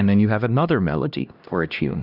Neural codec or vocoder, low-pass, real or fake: codec, 16 kHz, 1 kbps, X-Codec, HuBERT features, trained on LibriSpeech; 5.4 kHz; fake